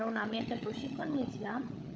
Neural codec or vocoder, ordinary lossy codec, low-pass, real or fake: codec, 16 kHz, 16 kbps, FunCodec, trained on LibriTTS, 50 frames a second; none; none; fake